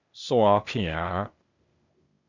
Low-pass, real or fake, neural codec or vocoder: 7.2 kHz; fake; codec, 16 kHz, 0.8 kbps, ZipCodec